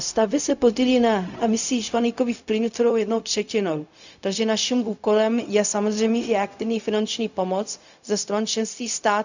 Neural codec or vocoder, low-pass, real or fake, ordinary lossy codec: codec, 16 kHz, 0.4 kbps, LongCat-Audio-Codec; 7.2 kHz; fake; none